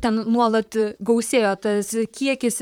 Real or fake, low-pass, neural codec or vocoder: fake; 19.8 kHz; vocoder, 44.1 kHz, 128 mel bands, Pupu-Vocoder